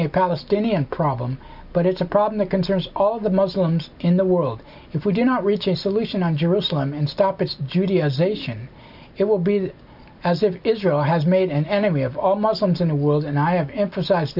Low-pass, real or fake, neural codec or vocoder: 5.4 kHz; real; none